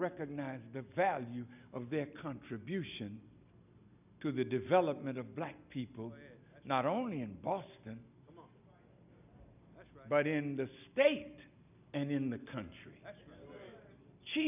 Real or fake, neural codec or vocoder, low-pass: real; none; 3.6 kHz